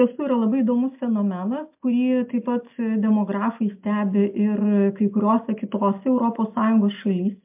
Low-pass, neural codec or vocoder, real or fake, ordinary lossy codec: 3.6 kHz; none; real; MP3, 32 kbps